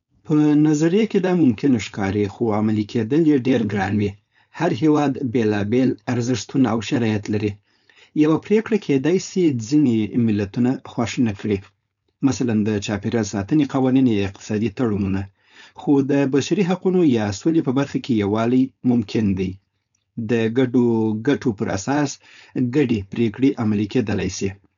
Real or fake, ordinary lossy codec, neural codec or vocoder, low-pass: fake; none; codec, 16 kHz, 4.8 kbps, FACodec; 7.2 kHz